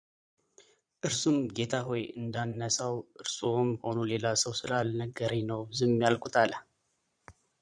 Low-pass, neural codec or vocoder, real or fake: 9.9 kHz; vocoder, 22.05 kHz, 80 mel bands, Vocos; fake